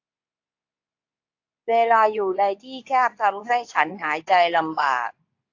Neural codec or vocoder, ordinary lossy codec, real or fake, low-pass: codec, 24 kHz, 0.9 kbps, WavTokenizer, medium speech release version 2; AAC, 48 kbps; fake; 7.2 kHz